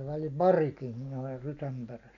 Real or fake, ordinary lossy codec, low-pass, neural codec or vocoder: real; none; 7.2 kHz; none